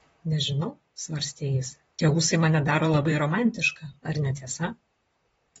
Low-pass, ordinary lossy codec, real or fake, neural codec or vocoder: 19.8 kHz; AAC, 24 kbps; fake; vocoder, 44.1 kHz, 128 mel bands, Pupu-Vocoder